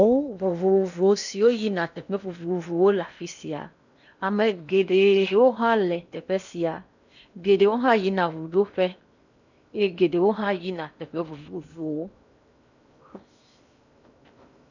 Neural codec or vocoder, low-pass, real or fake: codec, 16 kHz in and 24 kHz out, 0.8 kbps, FocalCodec, streaming, 65536 codes; 7.2 kHz; fake